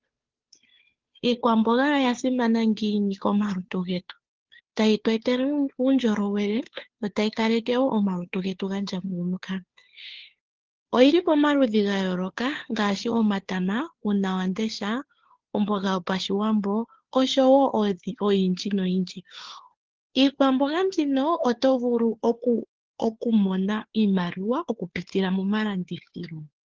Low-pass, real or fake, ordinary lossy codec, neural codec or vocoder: 7.2 kHz; fake; Opus, 16 kbps; codec, 16 kHz, 2 kbps, FunCodec, trained on Chinese and English, 25 frames a second